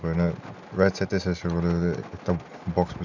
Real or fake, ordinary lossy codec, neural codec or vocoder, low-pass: real; none; none; 7.2 kHz